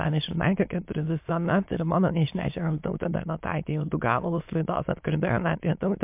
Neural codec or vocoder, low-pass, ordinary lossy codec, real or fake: autoencoder, 22.05 kHz, a latent of 192 numbers a frame, VITS, trained on many speakers; 3.6 kHz; MP3, 32 kbps; fake